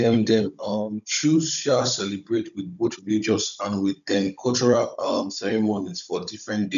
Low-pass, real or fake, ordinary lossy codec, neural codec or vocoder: 7.2 kHz; fake; none; codec, 16 kHz, 16 kbps, FunCodec, trained on Chinese and English, 50 frames a second